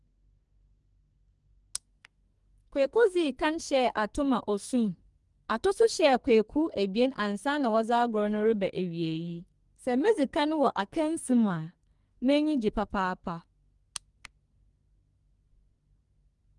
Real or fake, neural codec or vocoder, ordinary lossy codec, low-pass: fake; codec, 44.1 kHz, 2.6 kbps, SNAC; Opus, 24 kbps; 10.8 kHz